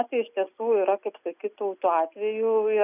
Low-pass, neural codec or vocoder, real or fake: 3.6 kHz; none; real